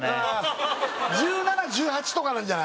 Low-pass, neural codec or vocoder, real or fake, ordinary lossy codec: none; none; real; none